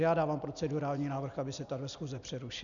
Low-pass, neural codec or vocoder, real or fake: 7.2 kHz; none; real